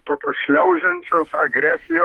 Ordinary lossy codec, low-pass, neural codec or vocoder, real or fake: Opus, 24 kbps; 19.8 kHz; autoencoder, 48 kHz, 32 numbers a frame, DAC-VAE, trained on Japanese speech; fake